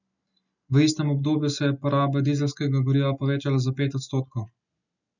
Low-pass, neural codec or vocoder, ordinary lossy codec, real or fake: 7.2 kHz; none; none; real